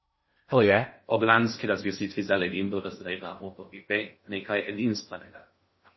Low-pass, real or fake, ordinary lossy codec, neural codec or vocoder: 7.2 kHz; fake; MP3, 24 kbps; codec, 16 kHz in and 24 kHz out, 0.6 kbps, FocalCodec, streaming, 2048 codes